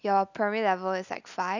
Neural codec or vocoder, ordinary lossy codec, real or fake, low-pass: none; none; real; 7.2 kHz